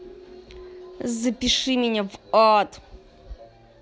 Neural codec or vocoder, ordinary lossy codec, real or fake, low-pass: none; none; real; none